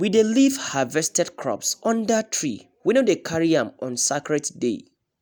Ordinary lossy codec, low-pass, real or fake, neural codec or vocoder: none; none; real; none